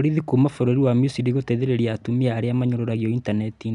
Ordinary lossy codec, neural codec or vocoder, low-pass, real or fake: none; none; 10.8 kHz; real